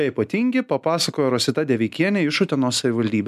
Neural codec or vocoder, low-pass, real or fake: none; 14.4 kHz; real